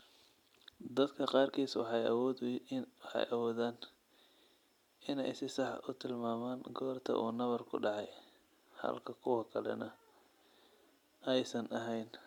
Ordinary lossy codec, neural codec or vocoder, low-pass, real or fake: MP3, 96 kbps; none; 19.8 kHz; real